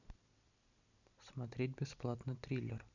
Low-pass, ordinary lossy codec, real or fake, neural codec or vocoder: 7.2 kHz; none; real; none